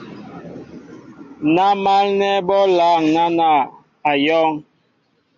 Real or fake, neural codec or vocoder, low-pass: real; none; 7.2 kHz